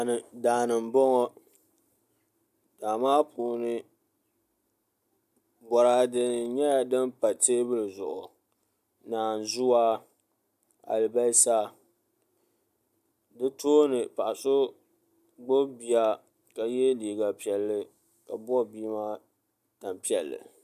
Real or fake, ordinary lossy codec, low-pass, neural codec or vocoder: real; AAC, 96 kbps; 14.4 kHz; none